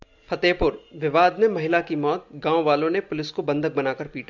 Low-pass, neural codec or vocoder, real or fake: 7.2 kHz; none; real